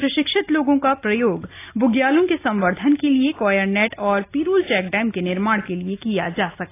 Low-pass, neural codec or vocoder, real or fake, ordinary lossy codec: 3.6 kHz; none; real; AAC, 24 kbps